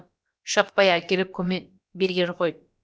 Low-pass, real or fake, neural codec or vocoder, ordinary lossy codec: none; fake; codec, 16 kHz, about 1 kbps, DyCAST, with the encoder's durations; none